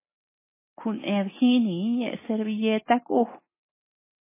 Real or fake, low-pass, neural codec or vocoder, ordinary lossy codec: real; 3.6 kHz; none; MP3, 16 kbps